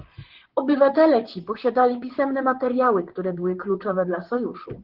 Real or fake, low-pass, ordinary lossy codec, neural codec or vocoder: fake; 5.4 kHz; Opus, 16 kbps; vocoder, 44.1 kHz, 128 mel bands, Pupu-Vocoder